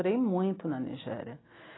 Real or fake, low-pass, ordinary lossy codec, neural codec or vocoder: real; 7.2 kHz; AAC, 16 kbps; none